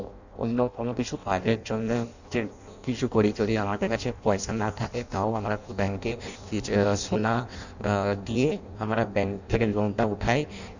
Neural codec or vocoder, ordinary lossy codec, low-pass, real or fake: codec, 16 kHz in and 24 kHz out, 0.6 kbps, FireRedTTS-2 codec; none; 7.2 kHz; fake